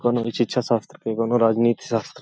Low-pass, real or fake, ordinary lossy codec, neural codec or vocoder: none; real; none; none